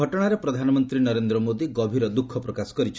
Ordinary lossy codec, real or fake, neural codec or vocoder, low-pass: none; real; none; none